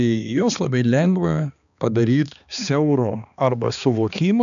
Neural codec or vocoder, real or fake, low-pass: codec, 16 kHz, 2 kbps, X-Codec, HuBERT features, trained on balanced general audio; fake; 7.2 kHz